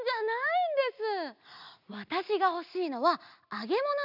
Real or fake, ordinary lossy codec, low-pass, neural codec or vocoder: real; none; 5.4 kHz; none